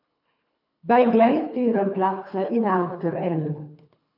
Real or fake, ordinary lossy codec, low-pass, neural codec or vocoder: fake; AAC, 48 kbps; 5.4 kHz; codec, 24 kHz, 3 kbps, HILCodec